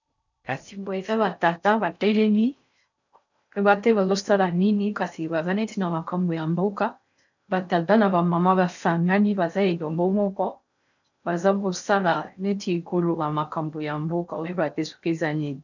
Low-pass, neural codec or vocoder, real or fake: 7.2 kHz; codec, 16 kHz in and 24 kHz out, 0.6 kbps, FocalCodec, streaming, 4096 codes; fake